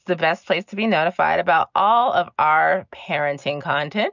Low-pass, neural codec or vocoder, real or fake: 7.2 kHz; none; real